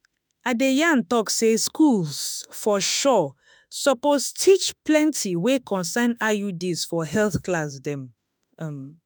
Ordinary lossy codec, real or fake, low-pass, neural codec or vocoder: none; fake; none; autoencoder, 48 kHz, 32 numbers a frame, DAC-VAE, trained on Japanese speech